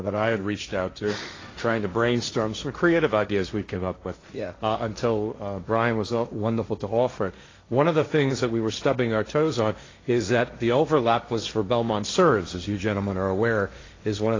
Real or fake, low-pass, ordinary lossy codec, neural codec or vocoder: fake; 7.2 kHz; AAC, 32 kbps; codec, 16 kHz, 1.1 kbps, Voila-Tokenizer